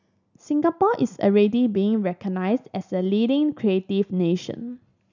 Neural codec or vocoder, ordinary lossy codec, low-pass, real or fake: none; none; 7.2 kHz; real